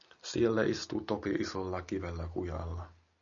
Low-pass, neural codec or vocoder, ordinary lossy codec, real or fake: 7.2 kHz; none; MP3, 96 kbps; real